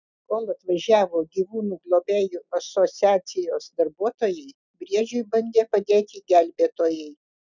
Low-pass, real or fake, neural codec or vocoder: 7.2 kHz; real; none